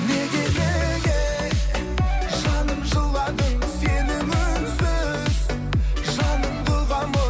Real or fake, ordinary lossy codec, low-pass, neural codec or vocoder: real; none; none; none